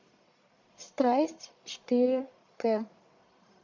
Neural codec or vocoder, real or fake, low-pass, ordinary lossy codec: codec, 44.1 kHz, 1.7 kbps, Pupu-Codec; fake; 7.2 kHz; MP3, 64 kbps